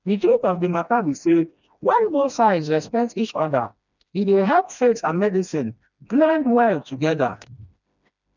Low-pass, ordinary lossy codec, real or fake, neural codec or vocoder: 7.2 kHz; none; fake; codec, 16 kHz, 1 kbps, FreqCodec, smaller model